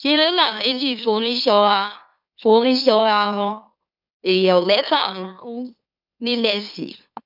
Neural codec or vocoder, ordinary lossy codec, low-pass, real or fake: autoencoder, 44.1 kHz, a latent of 192 numbers a frame, MeloTTS; none; 5.4 kHz; fake